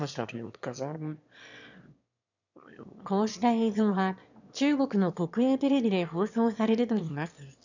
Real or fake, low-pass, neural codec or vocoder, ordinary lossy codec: fake; 7.2 kHz; autoencoder, 22.05 kHz, a latent of 192 numbers a frame, VITS, trained on one speaker; none